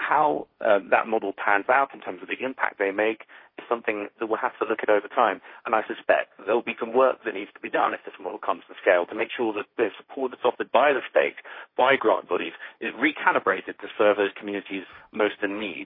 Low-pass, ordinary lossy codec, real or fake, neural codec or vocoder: 5.4 kHz; MP3, 24 kbps; fake; codec, 16 kHz, 1.1 kbps, Voila-Tokenizer